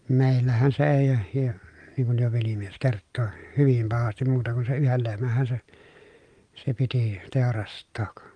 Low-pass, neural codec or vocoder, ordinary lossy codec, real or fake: 9.9 kHz; none; Opus, 32 kbps; real